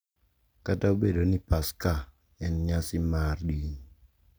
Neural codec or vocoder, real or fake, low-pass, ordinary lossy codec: none; real; none; none